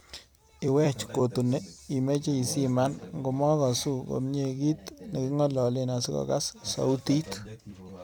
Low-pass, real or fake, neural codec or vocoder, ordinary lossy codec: none; fake; vocoder, 44.1 kHz, 128 mel bands every 256 samples, BigVGAN v2; none